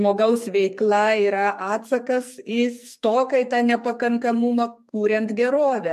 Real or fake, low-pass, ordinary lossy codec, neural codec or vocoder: fake; 14.4 kHz; MP3, 64 kbps; codec, 44.1 kHz, 2.6 kbps, SNAC